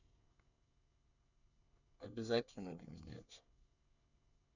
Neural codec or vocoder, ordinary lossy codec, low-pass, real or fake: codec, 24 kHz, 1 kbps, SNAC; none; 7.2 kHz; fake